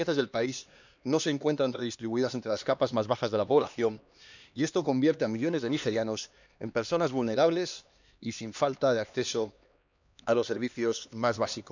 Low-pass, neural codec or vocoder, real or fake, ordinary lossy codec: 7.2 kHz; codec, 16 kHz, 2 kbps, X-Codec, HuBERT features, trained on LibriSpeech; fake; none